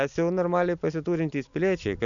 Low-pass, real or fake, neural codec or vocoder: 7.2 kHz; real; none